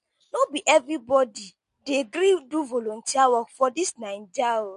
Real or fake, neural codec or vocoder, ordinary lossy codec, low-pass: real; none; MP3, 48 kbps; 14.4 kHz